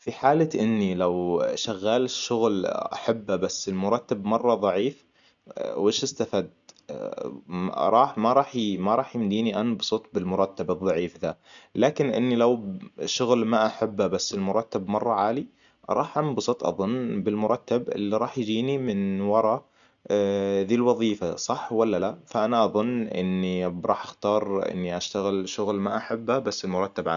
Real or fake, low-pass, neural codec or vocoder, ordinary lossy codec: real; 7.2 kHz; none; none